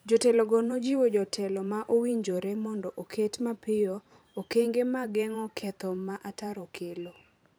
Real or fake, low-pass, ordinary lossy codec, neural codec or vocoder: fake; none; none; vocoder, 44.1 kHz, 128 mel bands every 512 samples, BigVGAN v2